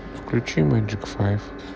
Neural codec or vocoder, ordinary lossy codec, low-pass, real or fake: none; none; none; real